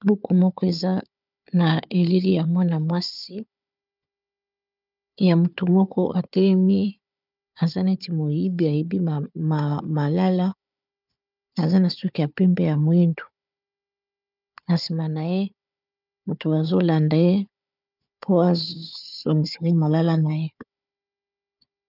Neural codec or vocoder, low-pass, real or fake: codec, 16 kHz, 4 kbps, FunCodec, trained on Chinese and English, 50 frames a second; 5.4 kHz; fake